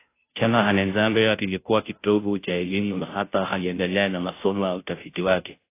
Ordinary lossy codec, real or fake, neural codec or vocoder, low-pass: AAC, 24 kbps; fake; codec, 16 kHz, 0.5 kbps, FunCodec, trained on Chinese and English, 25 frames a second; 3.6 kHz